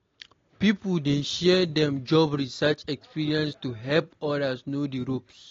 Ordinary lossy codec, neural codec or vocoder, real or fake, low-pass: AAC, 32 kbps; none; real; 7.2 kHz